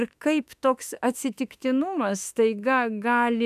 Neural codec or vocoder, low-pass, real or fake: autoencoder, 48 kHz, 32 numbers a frame, DAC-VAE, trained on Japanese speech; 14.4 kHz; fake